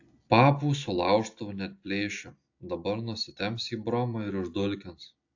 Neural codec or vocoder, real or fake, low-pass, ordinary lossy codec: none; real; 7.2 kHz; AAC, 48 kbps